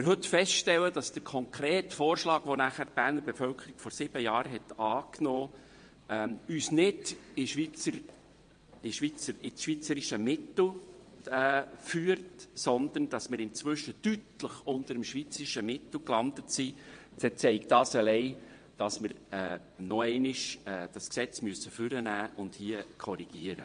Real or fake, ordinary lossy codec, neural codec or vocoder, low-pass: fake; MP3, 48 kbps; vocoder, 22.05 kHz, 80 mel bands, WaveNeXt; 9.9 kHz